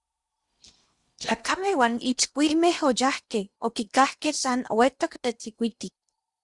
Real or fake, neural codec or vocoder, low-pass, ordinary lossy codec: fake; codec, 16 kHz in and 24 kHz out, 0.8 kbps, FocalCodec, streaming, 65536 codes; 10.8 kHz; Opus, 64 kbps